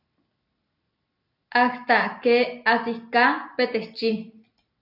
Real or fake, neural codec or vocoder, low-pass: real; none; 5.4 kHz